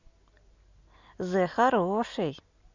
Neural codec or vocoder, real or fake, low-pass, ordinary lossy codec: none; real; 7.2 kHz; Opus, 64 kbps